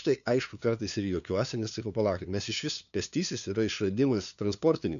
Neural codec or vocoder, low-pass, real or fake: codec, 16 kHz, 2 kbps, FunCodec, trained on LibriTTS, 25 frames a second; 7.2 kHz; fake